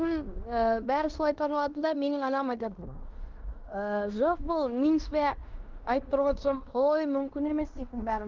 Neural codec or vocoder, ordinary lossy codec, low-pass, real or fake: codec, 16 kHz in and 24 kHz out, 0.9 kbps, LongCat-Audio-Codec, fine tuned four codebook decoder; Opus, 32 kbps; 7.2 kHz; fake